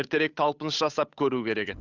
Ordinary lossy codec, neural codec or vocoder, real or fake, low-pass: none; codec, 16 kHz, 8 kbps, FunCodec, trained on Chinese and English, 25 frames a second; fake; 7.2 kHz